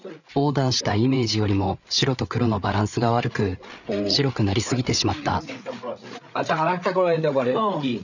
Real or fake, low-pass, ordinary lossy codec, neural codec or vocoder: fake; 7.2 kHz; none; codec, 16 kHz, 8 kbps, FreqCodec, larger model